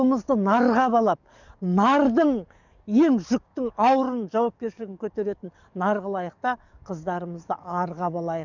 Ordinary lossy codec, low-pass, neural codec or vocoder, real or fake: none; 7.2 kHz; codec, 44.1 kHz, 7.8 kbps, DAC; fake